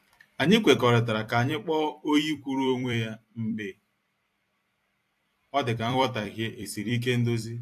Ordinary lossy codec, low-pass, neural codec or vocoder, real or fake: AAC, 64 kbps; 14.4 kHz; vocoder, 44.1 kHz, 128 mel bands every 256 samples, BigVGAN v2; fake